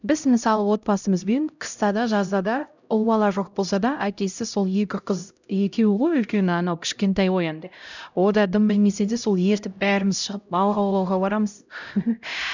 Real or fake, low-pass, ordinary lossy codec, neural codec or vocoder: fake; 7.2 kHz; none; codec, 16 kHz, 0.5 kbps, X-Codec, HuBERT features, trained on LibriSpeech